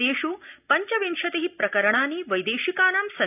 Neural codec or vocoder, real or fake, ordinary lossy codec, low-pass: none; real; none; 3.6 kHz